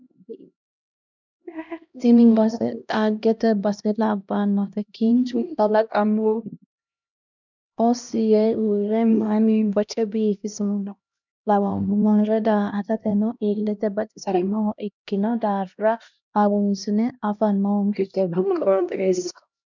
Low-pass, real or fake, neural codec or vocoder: 7.2 kHz; fake; codec, 16 kHz, 1 kbps, X-Codec, HuBERT features, trained on LibriSpeech